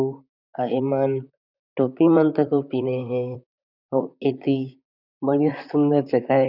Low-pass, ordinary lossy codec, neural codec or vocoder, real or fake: 5.4 kHz; none; vocoder, 44.1 kHz, 128 mel bands, Pupu-Vocoder; fake